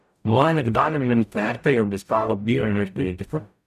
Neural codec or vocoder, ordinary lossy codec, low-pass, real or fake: codec, 44.1 kHz, 0.9 kbps, DAC; none; 14.4 kHz; fake